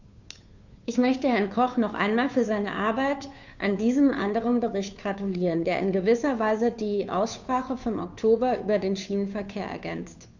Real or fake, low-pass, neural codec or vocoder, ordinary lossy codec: fake; 7.2 kHz; codec, 16 kHz, 2 kbps, FunCodec, trained on Chinese and English, 25 frames a second; none